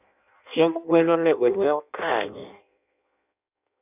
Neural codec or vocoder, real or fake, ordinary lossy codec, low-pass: codec, 16 kHz in and 24 kHz out, 0.6 kbps, FireRedTTS-2 codec; fake; none; 3.6 kHz